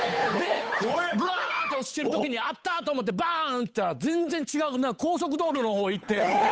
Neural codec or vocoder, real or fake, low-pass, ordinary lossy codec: codec, 16 kHz, 8 kbps, FunCodec, trained on Chinese and English, 25 frames a second; fake; none; none